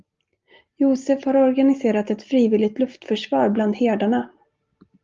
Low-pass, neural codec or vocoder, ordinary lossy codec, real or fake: 7.2 kHz; none; Opus, 32 kbps; real